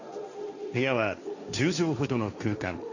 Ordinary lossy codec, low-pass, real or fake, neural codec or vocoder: none; 7.2 kHz; fake; codec, 16 kHz, 1.1 kbps, Voila-Tokenizer